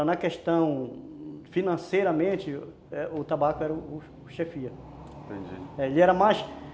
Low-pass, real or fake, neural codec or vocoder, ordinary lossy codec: none; real; none; none